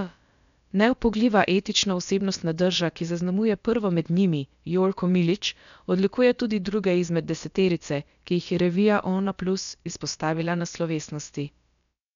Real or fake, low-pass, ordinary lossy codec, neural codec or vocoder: fake; 7.2 kHz; none; codec, 16 kHz, about 1 kbps, DyCAST, with the encoder's durations